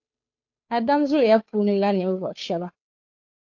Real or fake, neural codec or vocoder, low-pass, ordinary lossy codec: fake; codec, 16 kHz, 2 kbps, FunCodec, trained on Chinese and English, 25 frames a second; 7.2 kHz; AAC, 48 kbps